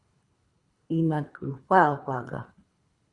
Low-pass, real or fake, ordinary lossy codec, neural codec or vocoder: 10.8 kHz; fake; Opus, 64 kbps; codec, 24 kHz, 3 kbps, HILCodec